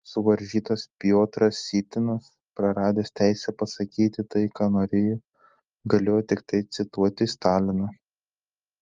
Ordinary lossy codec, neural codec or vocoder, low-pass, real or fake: Opus, 32 kbps; none; 7.2 kHz; real